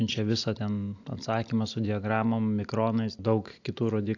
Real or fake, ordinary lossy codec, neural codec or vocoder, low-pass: real; AAC, 48 kbps; none; 7.2 kHz